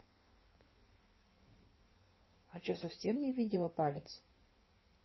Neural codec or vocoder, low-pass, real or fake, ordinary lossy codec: codec, 16 kHz in and 24 kHz out, 1.1 kbps, FireRedTTS-2 codec; 7.2 kHz; fake; MP3, 24 kbps